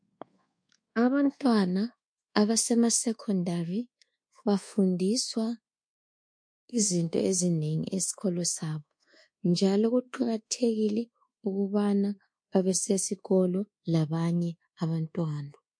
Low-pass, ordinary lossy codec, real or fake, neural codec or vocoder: 9.9 kHz; MP3, 48 kbps; fake; codec, 24 kHz, 1.2 kbps, DualCodec